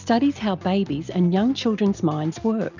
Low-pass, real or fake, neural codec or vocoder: 7.2 kHz; real; none